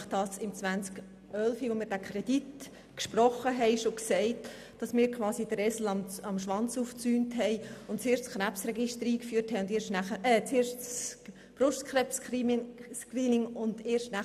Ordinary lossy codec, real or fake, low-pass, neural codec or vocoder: none; real; 14.4 kHz; none